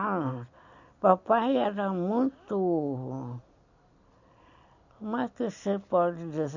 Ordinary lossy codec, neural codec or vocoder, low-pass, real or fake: none; none; 7.2 kHz; real